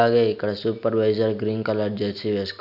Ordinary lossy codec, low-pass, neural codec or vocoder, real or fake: none; 5.4 kHz; none; real